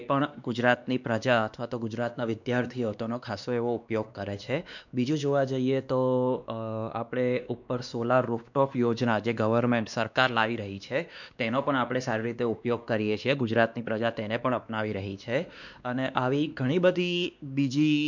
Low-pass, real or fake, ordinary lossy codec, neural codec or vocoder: 7.2 kHz; fake; none; codec, 16 kHz, 2 kbps, X-Codec, WavLM features, trained on Multilingual LibriSpeech